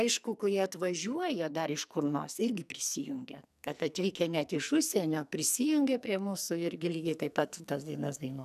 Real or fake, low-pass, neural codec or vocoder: fake; 14.4 kHz; codec, 44.1 kHz, 2.6 kbps, SNAC